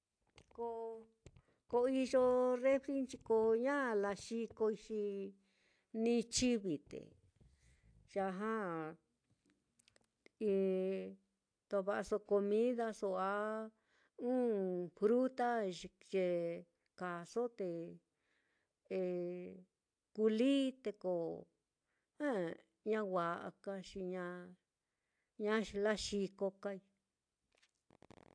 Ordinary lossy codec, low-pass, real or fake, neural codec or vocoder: AAC, 64 kbps; 9.9 kHz; real; none